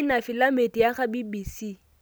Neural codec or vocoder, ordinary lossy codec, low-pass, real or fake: none; none; none; real